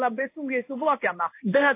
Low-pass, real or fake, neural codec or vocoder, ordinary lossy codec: 3.6 kHz; fake; codec, 16 kHz in and 24 kHz out, 1 kbps, XY-Tokenizer; AAC, 24 kbps